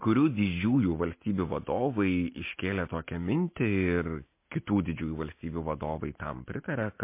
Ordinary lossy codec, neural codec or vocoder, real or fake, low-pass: MP3, 24 kbps; none; real; 3.6 kHz